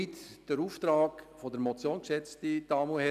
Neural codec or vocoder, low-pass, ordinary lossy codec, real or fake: none; 14.4 kHz; none; real